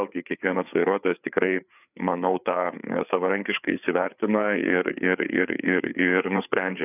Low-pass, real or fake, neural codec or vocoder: 3.6 kHz; fake; codec, 16 kHz, 4 kbps, FreqCodec, larger model